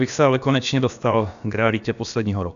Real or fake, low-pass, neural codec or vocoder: fake; 7.2 kHz; codec, 16 kHz, about 1 kbps, DyCAST, with the encoder's durations